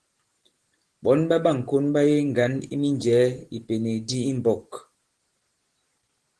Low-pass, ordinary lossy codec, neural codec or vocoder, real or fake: 10.8 kHz; Opus, 16 kbps; none; real